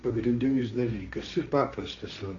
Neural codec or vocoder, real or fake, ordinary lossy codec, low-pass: codec, 16 kHz, 1.1 kbps, Voila-Tokenizer; fake; Opus, 64 kbps; 7.2 kHz